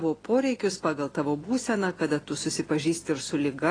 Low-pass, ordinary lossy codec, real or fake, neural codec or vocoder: 9.9 kHz; AAC, 32 kbps; real; none